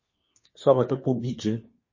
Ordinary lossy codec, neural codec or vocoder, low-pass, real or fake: MP3, 32 kbps; codec, 24 kHz, 1 kbps, SNAC; 7.2 kHz; fake